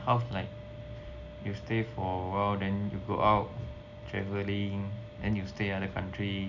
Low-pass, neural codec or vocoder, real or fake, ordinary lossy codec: 7.2 kHz; none; real; none